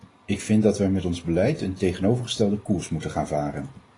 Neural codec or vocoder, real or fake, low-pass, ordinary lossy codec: none; real; 10.8 kHz; AAC, 32 kbps